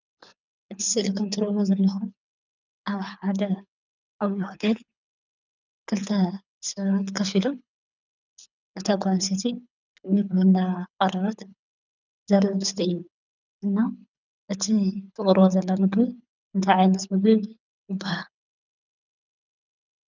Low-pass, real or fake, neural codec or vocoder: 7.2 kHz; fake; codec, 24 kHz, 6 kbps, HILCodec